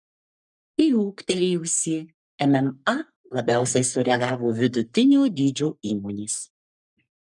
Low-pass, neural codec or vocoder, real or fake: 10.8 kHz; codec, 44.1 kHz, 3.4 kbps, Pupu-Codec; fake